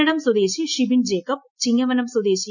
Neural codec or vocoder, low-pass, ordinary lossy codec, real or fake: none; 7.2 kHz; none; real